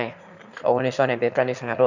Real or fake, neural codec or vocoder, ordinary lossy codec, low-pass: fake; autoencoder, 22.05 kHz, a latent of 192 numbers a frame, VITS, trained on one speaker; none; 7.2 kHz